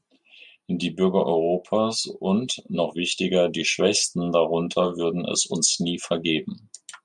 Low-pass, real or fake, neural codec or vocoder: 10.8 kHz; real; none